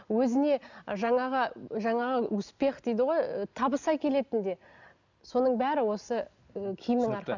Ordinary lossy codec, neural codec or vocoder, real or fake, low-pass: none; none; real; 7.2 kHz